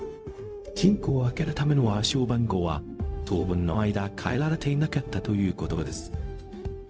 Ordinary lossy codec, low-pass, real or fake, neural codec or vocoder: none; none; fake; codec, 16 kHz, 0.4 kbps, LongCat-Audio-Codec